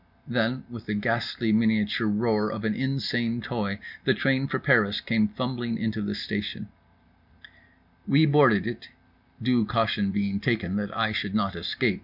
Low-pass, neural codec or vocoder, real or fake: 5.4 kHz; none; real